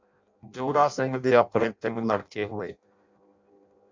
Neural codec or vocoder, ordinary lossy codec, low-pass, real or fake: codec, 16 kHz in and 24 kHz out, 0.6 kbps, FireRedTTS-2 codec; MP3, 64 kbps; 7.2 kHz; fake